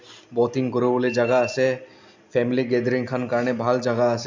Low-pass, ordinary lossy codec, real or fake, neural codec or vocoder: 7.2 kHz; MP3, 64 kbps; real; none